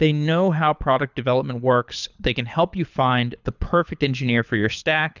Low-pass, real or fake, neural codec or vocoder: 7.2 kHz; fake; codec, 24 kHz, 6 kbps, HILCodec